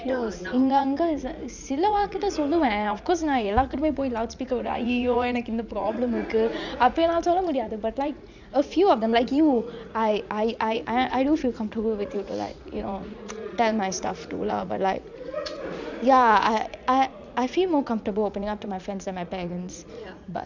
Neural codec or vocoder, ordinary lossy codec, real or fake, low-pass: vocoder, 44.1 kHz, 80 mel bands, Vocos; none; fake; 7.2 kHz